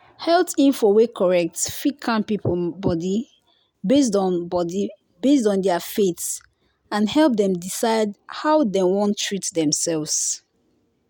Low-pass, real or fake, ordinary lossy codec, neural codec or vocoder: none; real; none; none